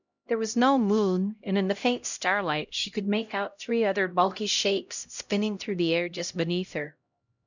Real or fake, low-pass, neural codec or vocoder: fake; 7.2 kHz; codec, 16 kHz, 0.5 kbps, X-Codec, HuBERT features, trained on LibriSpeech